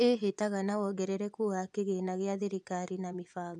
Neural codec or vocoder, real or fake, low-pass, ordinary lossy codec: vocoder, 24 kHz, 100 mel bands, Vocos; fake; none; none